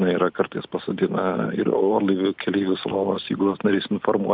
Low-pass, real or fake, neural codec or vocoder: 5.4 kHz; real; none